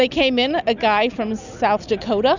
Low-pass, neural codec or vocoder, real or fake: 7.2 kHz; none; real